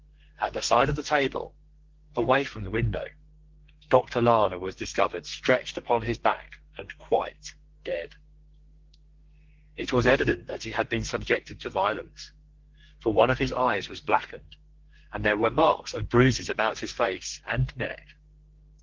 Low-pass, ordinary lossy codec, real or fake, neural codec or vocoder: 7.2 kHz; Opus, 16 kbps; fake; codec, 32 kHz, 1.9 kbps, SNAC